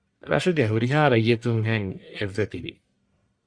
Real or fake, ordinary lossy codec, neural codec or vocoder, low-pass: fake; Opus, 64 kbps; codec, 44.1 kHz, 1.7 kbps, Pupu-Codec; 9.9 kHz